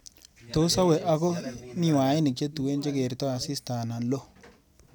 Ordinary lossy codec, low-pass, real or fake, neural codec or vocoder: none; none; real; none